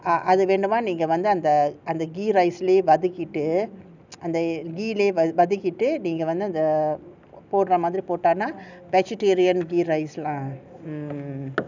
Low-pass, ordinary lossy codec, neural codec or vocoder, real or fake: 7.2 kHz; none; none; real